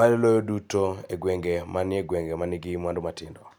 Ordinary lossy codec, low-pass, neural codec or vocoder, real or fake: none; none; none; real